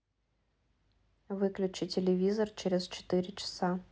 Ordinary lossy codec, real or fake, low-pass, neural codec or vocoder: none; real; none; none